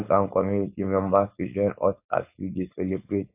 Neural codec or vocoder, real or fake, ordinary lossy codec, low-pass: codec, 16 kHz, 4.8 kbps, FACodec; fake; AAC, 32 kbps; 3.6 kHz